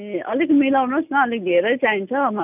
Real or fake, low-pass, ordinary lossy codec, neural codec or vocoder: real; 3.6 kHz; none; none